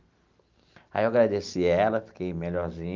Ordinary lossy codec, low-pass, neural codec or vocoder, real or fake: Opus, 24 kbps; 7.2 kHz; none; real